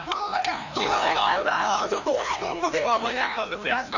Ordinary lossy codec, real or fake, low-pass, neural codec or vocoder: none; fake; 7.2 kHz; codec, 16 kHz, 1 kbps, FreqCodec, larger model